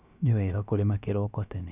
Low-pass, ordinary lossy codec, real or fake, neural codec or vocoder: 3.6 kHz; none; fake; codec, 16 kHz, about 1 kbps, DyCAST, with the encoder's durations